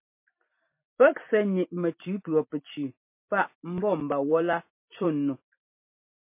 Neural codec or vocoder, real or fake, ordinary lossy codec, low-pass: vocoder, 44.1 kHz, 128 mel bands every 256 samples, BigVGAN v2; fake; MP3, 24 kbps; 3.6 kHz